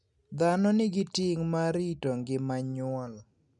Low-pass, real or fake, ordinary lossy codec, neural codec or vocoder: 10.8 kHz; real; none; none